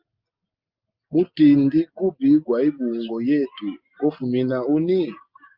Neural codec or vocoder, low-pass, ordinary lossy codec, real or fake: none; 5.4 kHz; Opus, 24 kbps; real